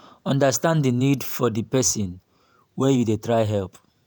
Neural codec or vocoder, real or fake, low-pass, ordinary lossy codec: vocoder, 48 kHz, 128 mel bands, Vocos; fake; none; none